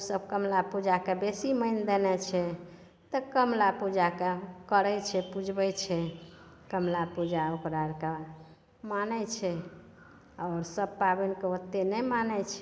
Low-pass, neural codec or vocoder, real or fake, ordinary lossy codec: none; none; real; none